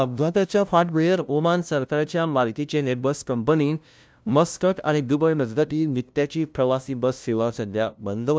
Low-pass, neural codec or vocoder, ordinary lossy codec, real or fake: none; codec, 16 kHz, 0.5 kbps, FunCodec, trained on LibriTTS, 25 frames a second; none; fake